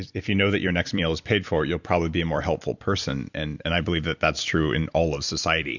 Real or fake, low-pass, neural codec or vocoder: fake; 7.2 kHz; vocoder, 44.1 kHz, 128 mel bands every 256 samples, BigVGAN v2